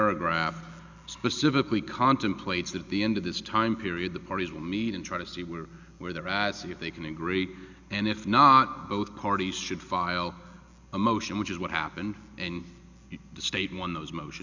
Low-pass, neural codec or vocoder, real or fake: 7.2 kHz; none; real